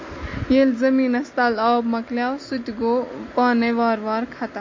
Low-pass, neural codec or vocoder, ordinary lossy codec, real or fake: 7.2 kHz; none; MP3, 32 kbps; real